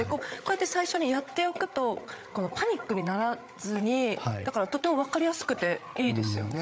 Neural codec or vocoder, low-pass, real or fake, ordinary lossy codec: codec, 16 kHz, 8 kbps, FreqCodec, larger model; none; fake; none